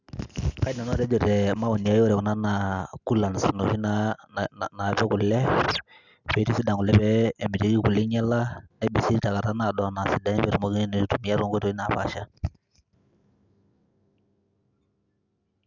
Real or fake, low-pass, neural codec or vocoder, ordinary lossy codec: real; 7.2 kHz; none; none